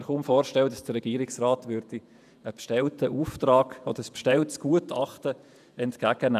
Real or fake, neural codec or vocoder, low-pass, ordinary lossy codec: fake; vocoder, 48 kHz, 128 mel bands, Vocos; 14.4 kHz; none